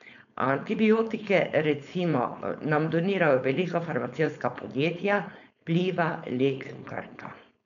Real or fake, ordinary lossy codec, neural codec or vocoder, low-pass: fake; none; codec, 16 kHz, 4.8 kbps, FACodec; 7.2 kHz